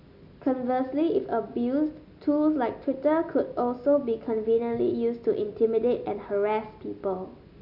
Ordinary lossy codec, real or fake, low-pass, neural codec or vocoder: none; real; 5.4 kHz; none